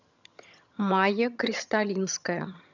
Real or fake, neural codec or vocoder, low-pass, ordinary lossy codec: fake; vocoder, 22.05 kHz, 80 mel bands, HiFi-GAN; 7.2 kHz; none